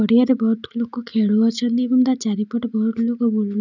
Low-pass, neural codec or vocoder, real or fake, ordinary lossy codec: 7.2 kHz; none; real; none